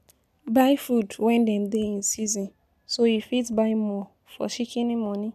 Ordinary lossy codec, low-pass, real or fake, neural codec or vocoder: none; 14.4 kHz; real; none